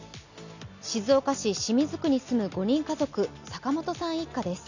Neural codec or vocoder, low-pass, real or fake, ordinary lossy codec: none; 7.2 kHz; real; none